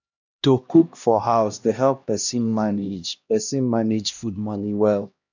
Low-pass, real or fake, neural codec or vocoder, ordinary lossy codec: 7.2 kHz; fake; codec, 16 kHz, 1 kbps, X-Codec, HuBERT features, trained on LibriSpeech; none